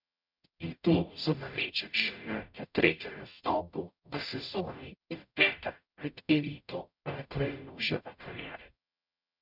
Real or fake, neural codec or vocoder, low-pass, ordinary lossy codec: fake; codec, 44.1 kHz, 0.9 kbps, DAC; 5.4 kHz; none